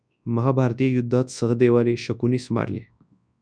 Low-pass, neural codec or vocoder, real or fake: 9.9 kHz; codec, 24 kHz, 0.9 kbps, WavTokenizer, large speech release; fake